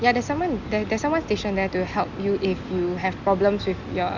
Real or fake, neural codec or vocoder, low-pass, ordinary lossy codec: real; none; 7.2 kHz; none